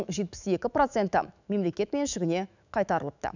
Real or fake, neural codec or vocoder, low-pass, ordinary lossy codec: fake; autoencoder, 48 kHz, 128 numbers a frame, DAC-VAE, trained on Japanese speech; 7.2 kHz; none